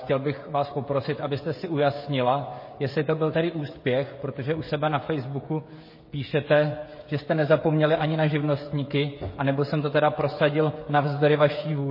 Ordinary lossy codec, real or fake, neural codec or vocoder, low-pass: MP3, 24 kbps; fake; codec, 16 kHz, 16 kbps, FreqCodec, smaller model; 5.4 kHz